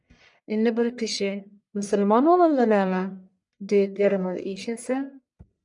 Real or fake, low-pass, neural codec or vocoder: fake; 10.8 kHz; codec, 44.1 kHz, 1.7 kbps, Pupu-Codec